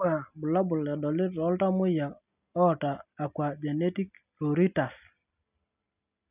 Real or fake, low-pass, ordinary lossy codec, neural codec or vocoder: real; 3.6 kHz; none; none